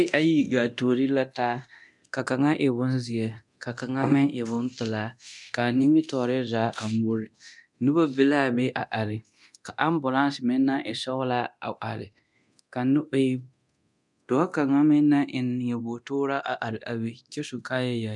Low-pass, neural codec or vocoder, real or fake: 10.8 kHz; codec, 24 kHz, 0.9 kbps, DualCodec; fake